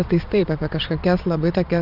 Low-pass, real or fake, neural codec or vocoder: 5.4 kHz; fake; vocoder, 22.05 kHz, 80 mel bands, Vocos